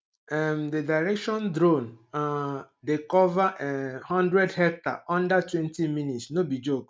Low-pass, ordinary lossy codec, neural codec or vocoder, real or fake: none; none; none; real